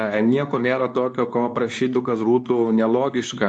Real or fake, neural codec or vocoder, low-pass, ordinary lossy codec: fake; codec, 24 kHz, 0.9 kbps, WavTokenizer, medium speech release version 2; 9.9 kHz; AAC, 64 kbps